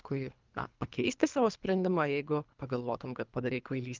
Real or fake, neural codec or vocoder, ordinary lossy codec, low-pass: fake; codec, 24 kHz, 3 kbps, HILCodec; Opus, 24 kbps; 7.2 kHz